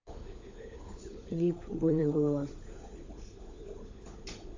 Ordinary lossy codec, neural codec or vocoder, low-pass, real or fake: AAC, 48 kbps; codec, 16 kHz, 16 kbps, FunCodec, trained on LibriTTS, 50 frames a second; 7.2 kHz; fake